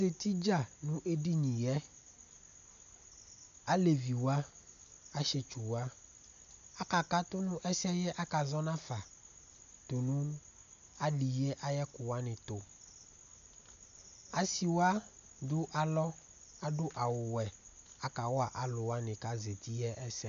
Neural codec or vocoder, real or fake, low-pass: none; real; 7.2 kHz